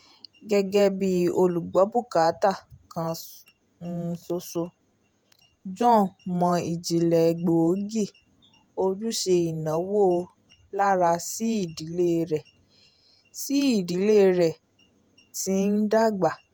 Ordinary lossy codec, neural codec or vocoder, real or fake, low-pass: none; vocoder, 48 kHz, 128 mel bands, Vocos; fake; none